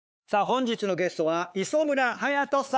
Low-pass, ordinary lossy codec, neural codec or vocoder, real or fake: none; none; codec, 16 kHz, 4 kbps, X-Codec, HuBERT features, trained on LibriSpeech; fake